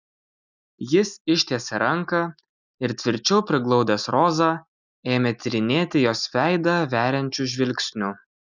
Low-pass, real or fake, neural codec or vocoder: 7.2 kHz; real; none